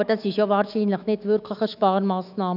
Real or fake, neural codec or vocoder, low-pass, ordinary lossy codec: fake; autoencoder, 48 kHz, 128 numbers a frame, DAC-VAE, trained on Japanese speech; 5.4 kHz; none